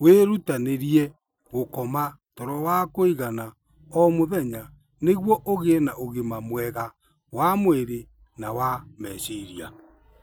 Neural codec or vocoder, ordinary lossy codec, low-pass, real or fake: none; none; none; real